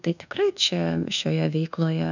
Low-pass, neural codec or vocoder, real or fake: 7.2 kHz; codec, 24 kHz, 1.2 kbps, DualCodec; fake